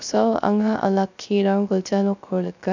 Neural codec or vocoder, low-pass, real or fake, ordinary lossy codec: codec, 16 kHz, 0.3 kbps, FocalCodec; 7.2 kHz; fake; none